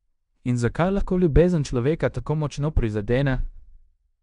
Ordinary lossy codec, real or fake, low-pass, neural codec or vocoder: none; fake; 10.8 kHz; codec, 16 kHz in and 24 kHz out, 0.9 kbps, LongCat-Audio-Codec, fine tuned four codebook decoder